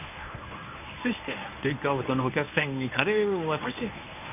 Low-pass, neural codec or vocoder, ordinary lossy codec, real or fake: 3.6 kHz; codec, 24 kHz, 0.9 kbps, WavTokenizer, medium speech release version 1; none; fake